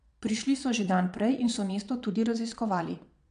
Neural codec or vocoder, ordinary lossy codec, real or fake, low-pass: vocoder, 22.05 kHz, 80 mel bands, Vocos; MP3, 96 kbps; fake; 9.9 kHz